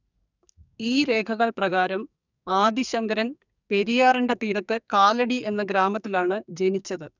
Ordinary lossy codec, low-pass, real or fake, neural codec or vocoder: none; 7.2 kHz; fake; codec, 44.1 kHz, 2.6 kbps, SNAC